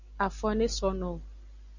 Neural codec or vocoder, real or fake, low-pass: none; real; 7.2 kHz